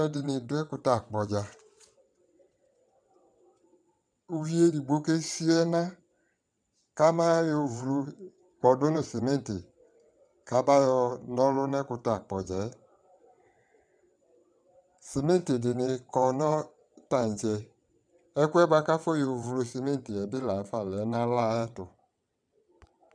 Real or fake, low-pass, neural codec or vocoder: fake; 9.9 kHz; vocoder, 22.05 kHz, 80 mel bands, WaveNeXt